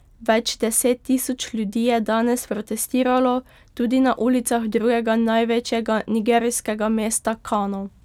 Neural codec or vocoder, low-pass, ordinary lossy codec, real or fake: none; 19.8 kHz; none; real